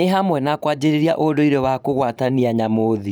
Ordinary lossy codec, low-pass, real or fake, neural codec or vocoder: none; 19.8 kHz; fake; vocoder, 44.1 kHz, 128 mel bands every 512 samples, BigVGAN v2